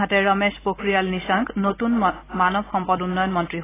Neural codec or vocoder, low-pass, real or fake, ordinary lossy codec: none; 3.6 kHz; real; AAC, 16 kbps